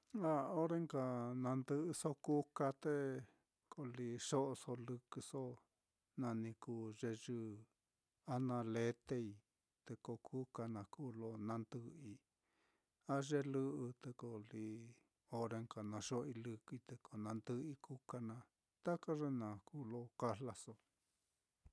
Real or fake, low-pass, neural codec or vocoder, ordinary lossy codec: real; none; none; none